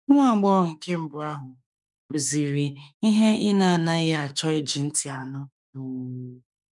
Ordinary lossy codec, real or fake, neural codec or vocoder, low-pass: none; fake; autoencoder, 48 kHz, 32 numbers a frame, DAC-VAE, trained on Japanese speech; 10.8 kHz